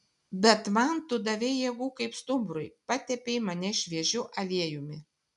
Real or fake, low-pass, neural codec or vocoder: real; 10.8 kHz; none